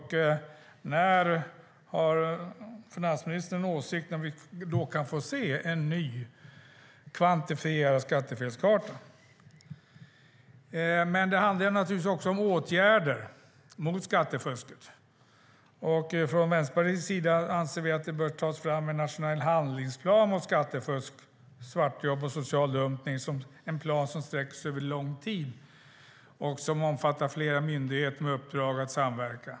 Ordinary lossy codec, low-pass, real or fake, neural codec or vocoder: none; none; real; none